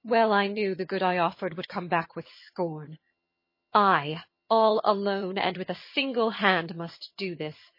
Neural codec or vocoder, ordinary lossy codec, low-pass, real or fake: vocoder, 22.05 kHz, 80 mel bands, HiFi-GAN; MP3, 24 kbps; 5.4 kHz; fake